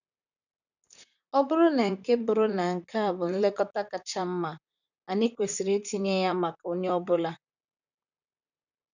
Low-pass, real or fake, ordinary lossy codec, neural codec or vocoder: 7.2 kHz; fake; none; vocoder, 44.1 kHz, 128 mel bands, Pupu-Vocoder